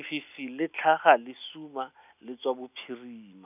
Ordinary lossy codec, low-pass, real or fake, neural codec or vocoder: none; 3.6 kHz; real; none